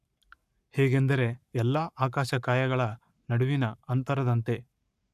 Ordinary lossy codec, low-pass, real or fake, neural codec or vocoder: none; 14.4 kHz; fake; codec, 44.1 kHz, 7.8 kbps, Pupu-Codec